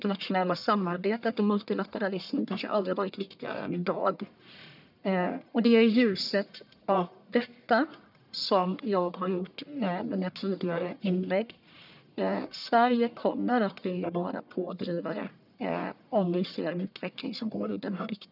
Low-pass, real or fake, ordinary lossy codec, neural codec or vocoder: 5.4 kHz; fake; none; codec, 44.1 kHz, 1.7 kbps, Pupu-Codec